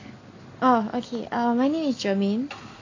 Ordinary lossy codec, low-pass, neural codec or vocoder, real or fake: AAC, 32 kbps; 7.2 kHz; none; real